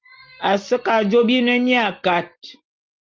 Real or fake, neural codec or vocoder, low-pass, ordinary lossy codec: real; none; 7.2 kHz; Opus, 24 kbps